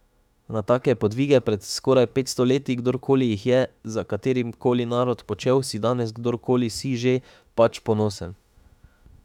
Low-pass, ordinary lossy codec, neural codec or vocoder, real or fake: 19.8 kHz; none; autoencoder, 48 kHz, 32 numbers a frame, DAC-VAE, trained on Japanese speech; fake